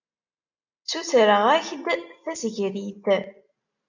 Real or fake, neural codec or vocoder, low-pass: real; none; 7.2 kHz